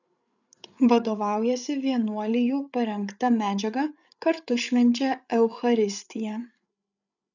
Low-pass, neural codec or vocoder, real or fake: 7.2 kHz; codec, 16 kHz, 8 kbps, FreqCodec, larger model; fake